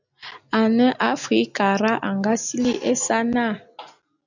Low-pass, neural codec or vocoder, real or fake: 7.2 kHz; none; real